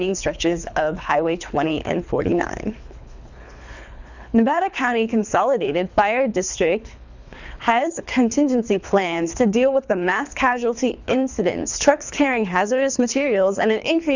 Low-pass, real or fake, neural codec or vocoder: 7.2 kHz; fake; codec, 24 kHz, 3 kbps, HILCodec